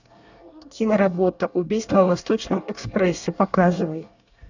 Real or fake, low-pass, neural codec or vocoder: fake; 7.2 kHz; codec, 24 kHz, 1 kbps, SNAC